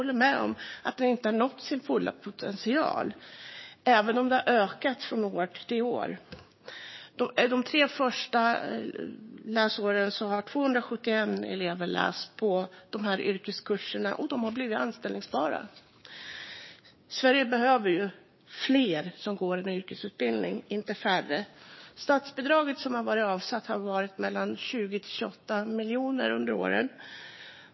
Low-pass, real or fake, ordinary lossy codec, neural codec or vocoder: 7.2 kHz; fake; MP3, 24 kbps; codec, 16 kHz, 6 kbps, DAC